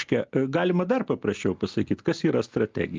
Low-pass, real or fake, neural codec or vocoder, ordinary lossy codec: 7.2 kHz; real; none; Opus, 24 kbps